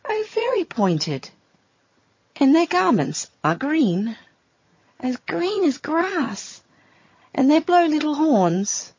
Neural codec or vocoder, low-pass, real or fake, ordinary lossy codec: vocoder, 22.05 kHz, 80 mel bands, HiFi-GAN; 7.2 kHz; fake; MP3, 32 kbps